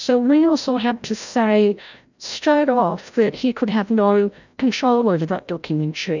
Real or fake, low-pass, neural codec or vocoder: fake; 7.2 kHz; codec, 16 kHz, 0.5 kbps, FreqCodec, larger model